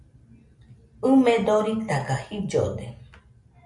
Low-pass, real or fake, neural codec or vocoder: 10.8 kHz; real; none